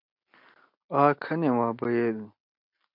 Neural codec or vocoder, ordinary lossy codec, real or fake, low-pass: none; MP3, 48 kbps; real; 5.4 kHz